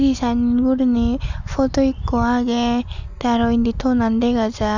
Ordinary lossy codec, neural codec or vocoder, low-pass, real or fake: none; none; 7.2 kHz; real